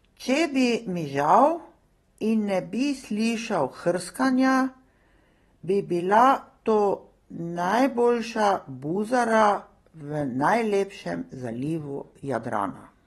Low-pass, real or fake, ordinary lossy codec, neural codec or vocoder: 19.8 kHz; real; AAC, 32 kbps; none